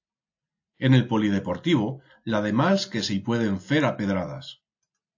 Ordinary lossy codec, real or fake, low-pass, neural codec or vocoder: AAC, 48 kbps; real; 7.2 kHz; none